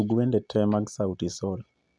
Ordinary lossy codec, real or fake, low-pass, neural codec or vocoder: none; real; none; none